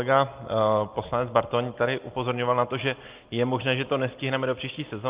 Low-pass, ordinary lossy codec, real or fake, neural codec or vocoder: 3.6 kHz; Opus, 32 kbps; real; none